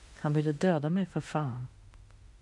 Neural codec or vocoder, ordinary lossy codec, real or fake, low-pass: autoencoder, 48 kHz, 32 numbers a frame, DAC-VAE, trained on Japanese speech; MP3, 64 kbps; fake; 10.8 kHz